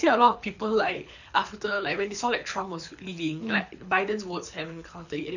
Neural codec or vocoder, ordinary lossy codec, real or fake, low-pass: codec, 24 kHz, 6 kbps, HILCodec; none; fake; 7.2 kHz